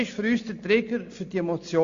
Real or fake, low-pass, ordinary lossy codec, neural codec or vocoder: real; 7.2 kHz; Opus, 64 kbps; none